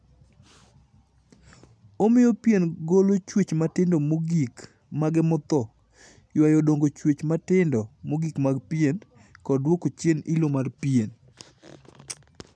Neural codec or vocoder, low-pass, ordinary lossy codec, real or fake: none; none; none; real